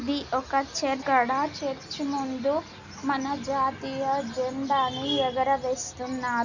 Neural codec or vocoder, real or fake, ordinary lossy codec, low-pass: none; real; none; 7.2 kHz